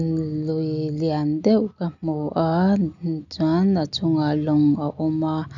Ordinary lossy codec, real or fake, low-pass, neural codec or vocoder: none; real; 7.2 kHz; none